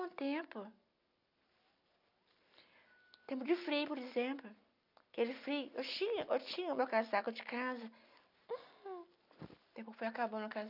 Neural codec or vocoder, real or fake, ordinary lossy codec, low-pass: none; real; none; 5.4 kHz